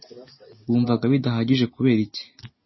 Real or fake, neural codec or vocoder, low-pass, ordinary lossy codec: real; none; 7.2 kHz; MP3, 24 kbps